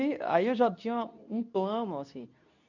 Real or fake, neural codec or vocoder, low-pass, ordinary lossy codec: fake; codec, 24 kHz, 0.9 kbps, WavTokenizer, medium speech release version 2; 7.2 kHz; none